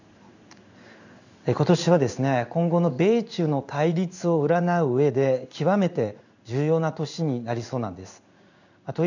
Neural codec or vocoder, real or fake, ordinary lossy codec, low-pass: codec, 16 kHz in and 24 kHz out, 1 kbps, XY-Tokenizer; fake; none; 7.2 kHz